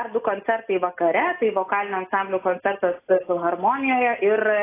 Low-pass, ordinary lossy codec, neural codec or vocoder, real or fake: 3.6 kHz; AAC, 24 kbps; none; real